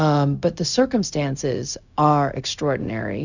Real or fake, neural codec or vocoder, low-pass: fake; codec, 16 kHz, 0.4 kbps, LongCat-Audio-Codec; 7.2 kHz